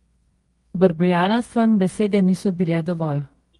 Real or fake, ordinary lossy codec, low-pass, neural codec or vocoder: fake; Opus, 24 kbps; 10.8 kHz; codec, 24 kHz, 0.9 kbps, WavTokenizer, medium music audio release